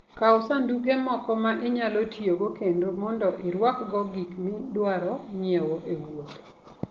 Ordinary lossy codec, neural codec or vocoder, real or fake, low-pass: Opus, 16 kbps; none; real; 7.2 kHz